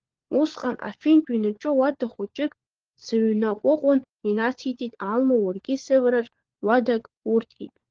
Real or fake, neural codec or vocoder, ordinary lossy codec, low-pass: fake; codec, 16 kHz, 4 kbps, FunCodec, trained on LibriTTS, 50 frames a second; Opus, 16 kbps; 7.2 kHz